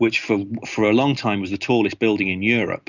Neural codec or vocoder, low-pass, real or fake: none; 7.2 kHz; real